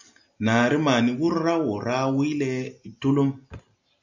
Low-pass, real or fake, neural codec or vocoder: 7.2 kHz; real; none